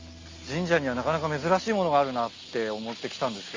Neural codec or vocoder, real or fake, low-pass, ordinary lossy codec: none; real; 7.2 kHz; Opus, 32 kbps